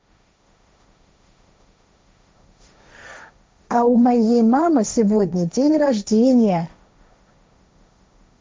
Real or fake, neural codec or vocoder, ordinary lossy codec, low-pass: fake; codec, 16 kHz, 1.1 kbps, Voila-Tokenizer; none; none